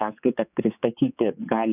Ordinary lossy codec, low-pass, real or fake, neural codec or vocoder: AAC, 32 kbps; 3.6 kHz; fake; codec, 16 kHz, 4 kbps, X-Codec, HuBERT features, trained on general audio